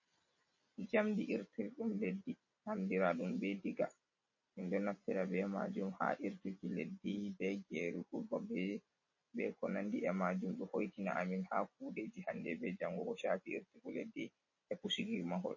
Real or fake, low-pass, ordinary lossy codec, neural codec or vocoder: real; 7.2 kHz; MP3, 32 kbps; none